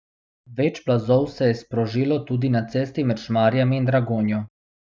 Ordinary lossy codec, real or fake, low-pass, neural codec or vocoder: none; real; none; none